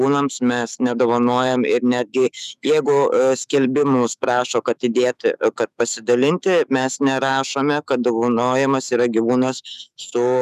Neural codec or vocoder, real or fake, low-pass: autoencoder, 48 kHz, 128 numbers a frame, DAC-VAE, trained on Japanese speech; fake; 14.4 kHz